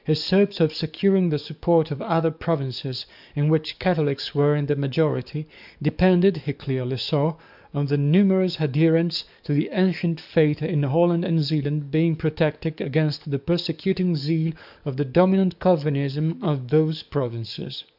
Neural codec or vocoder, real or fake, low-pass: codec, 44.1 kHz, 7.8 kbps, DAC; fake; 5.4 kHz